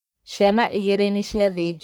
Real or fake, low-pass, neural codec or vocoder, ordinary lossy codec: fake; none; codec, 44.1 kHz, 1.7 kbps, Pupu-Codec; none